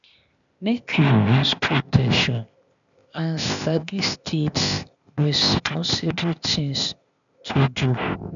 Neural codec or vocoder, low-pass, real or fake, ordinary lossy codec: codec, 16 kHz, 0.8 kbps, ZipCodec; 7.2 kHz; fake; none